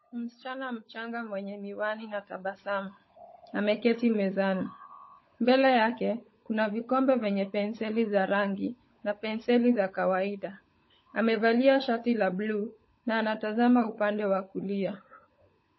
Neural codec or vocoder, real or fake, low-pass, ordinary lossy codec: codec, 16 kHz, 16 kbps, FunCodec, trained on LibriTTS, 50 frames a second; fake; 7.2 kHz; MP3, 24 kbps